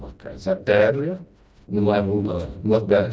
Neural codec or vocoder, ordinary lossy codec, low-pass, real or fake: codec, 16 kHz, 1 kbps, FreqCodec, smaller model; none; none; fake